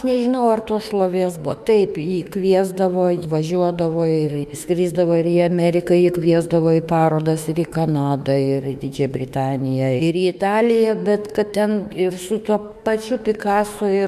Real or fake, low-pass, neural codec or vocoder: fake; 14.4 kHz; autoencoder, 48 kHz, 32 numbers a frame, DAC-VAE, trained on Japanese speech